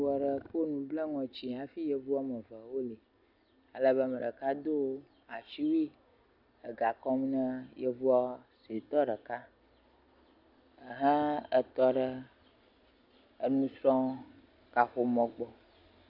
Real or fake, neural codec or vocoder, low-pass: real; none; 5.4 kHz